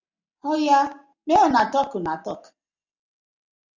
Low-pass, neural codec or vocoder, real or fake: 7.2 kHz; none; real